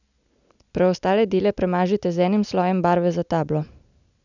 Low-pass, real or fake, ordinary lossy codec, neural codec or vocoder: 7.2 kHz; real; none; none